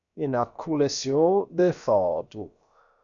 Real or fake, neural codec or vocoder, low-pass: fake; codec, 16 kHz, about 1 kbps, DyCAST, with the encoder's durations; 7.2 kHz